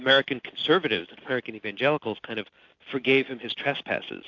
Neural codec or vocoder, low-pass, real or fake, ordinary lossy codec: none; 7.2 kHz; real; AAC, 48 kbps